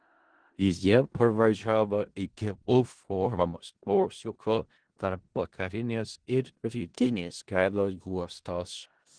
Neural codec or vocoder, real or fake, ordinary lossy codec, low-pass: codec, 16 kHz in and 24 kHz out, 0.4 kbps, LongCat-Audio-Codec, four codebook decoder; fake; Opus, 16 kbps; 9.9 kHz